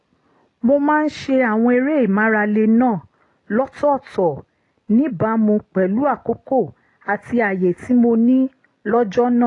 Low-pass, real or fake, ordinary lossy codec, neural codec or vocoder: 10.8 kHz; real; AAC, 32 kbps; none